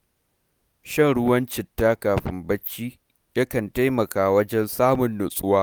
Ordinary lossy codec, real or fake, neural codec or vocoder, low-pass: none; real; none; none